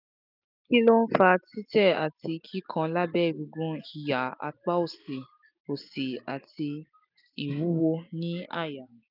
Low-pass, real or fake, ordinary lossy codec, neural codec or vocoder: 5.4 kHz; real; none; none